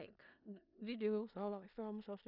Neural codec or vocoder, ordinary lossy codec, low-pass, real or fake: codec, 16 kHz in and 24 kHz out, 0.4 kbps, LongCat-Audio-Codec, four codebook decoder; none; 5.4 kHz; fake